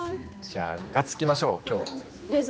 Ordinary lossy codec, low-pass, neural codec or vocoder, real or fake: none; none; codec, 16 kHz, 2 kbps, X-Codec, HuBERT features, trained on general audio; fake